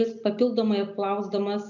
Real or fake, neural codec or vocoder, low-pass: real; none; 7.2 kHz